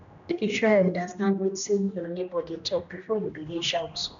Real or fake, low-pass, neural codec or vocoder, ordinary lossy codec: fake; 7.2 kHz; codec, 16 kHz, 1 kbps, X-Codec, HuBERT features, trained on general audio; none